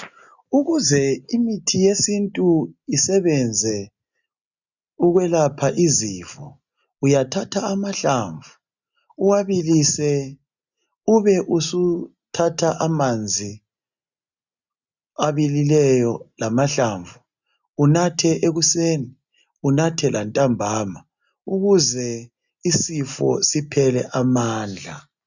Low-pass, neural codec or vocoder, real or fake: 7.2 kHz; none; real